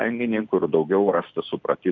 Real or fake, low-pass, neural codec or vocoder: real; 7.2 kHz; none